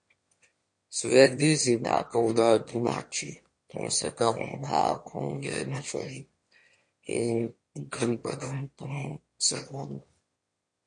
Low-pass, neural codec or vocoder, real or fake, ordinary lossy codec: 9.9 kHz; autoencoder, 22.05 kHz, a latent of 192 numbers a frame, VITS, trained on one speaker; fake; MP3, 48 kbps